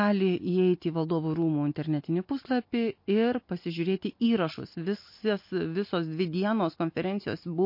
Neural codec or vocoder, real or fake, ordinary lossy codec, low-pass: none; real; MP3, 32 kbps; 5.4 kHz